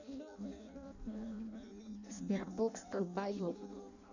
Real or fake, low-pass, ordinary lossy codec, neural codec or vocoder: fake; 7.2 kHz; none; codec, 16 kHz in and 24 kHz out, 0.6 kbps, FireRedTTS-2 codec